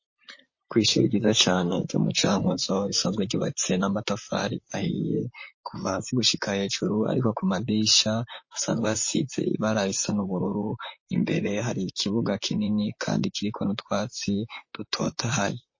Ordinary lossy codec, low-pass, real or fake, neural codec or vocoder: MP3, 32 kbps; 7.2 kHz; fake; vocoder, 44.1 kHz, 128 mel bands, Pupu-Vocoder